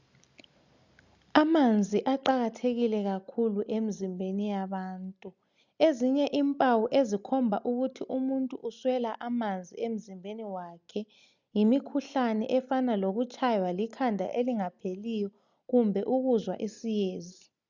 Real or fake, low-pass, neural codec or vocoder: real; 7.2 kHz; none